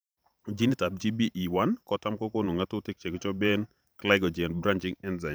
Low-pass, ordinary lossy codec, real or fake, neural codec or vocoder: none; none; fake; vocoder, 44.1 kHz, 128 mel bands every 512 samples, BigVGAN v2